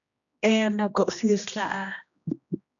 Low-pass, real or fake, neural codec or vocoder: 7.2 kHz; fake; codec, 16 kHz, 1 kbps, X-Codec, HuBERT features, trained on general audio